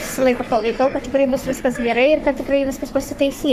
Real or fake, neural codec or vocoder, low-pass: fake; codec, 44.1 kHz, 3.4 kbps, Pupu-Codec; 14.4 kHz